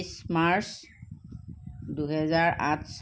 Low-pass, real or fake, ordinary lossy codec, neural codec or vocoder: none; real; none; none